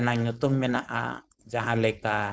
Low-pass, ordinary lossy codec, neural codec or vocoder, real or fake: none; none; codec, 16 kHz, 4.8 kbps, FACodec; fake